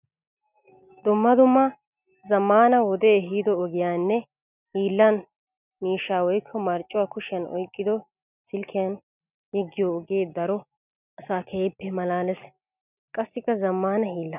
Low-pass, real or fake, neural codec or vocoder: 3.6 kHz; real; none